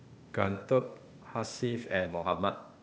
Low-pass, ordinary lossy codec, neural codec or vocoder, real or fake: none; none; codec, 16 kHz, 0.8 kbps, ZipCodec; fake